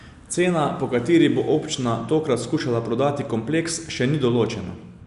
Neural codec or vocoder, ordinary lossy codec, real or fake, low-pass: none; none; real; 10.8 kHz